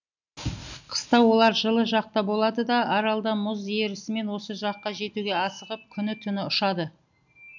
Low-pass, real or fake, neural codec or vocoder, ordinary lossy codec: 7.2 kHz; real; none; none